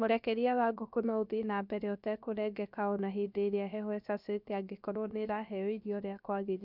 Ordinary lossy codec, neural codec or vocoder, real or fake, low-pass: none; codec, 16 kHz, about 1 kbps, DyCAST, with the encoder's durations; fake; 5.4 kHz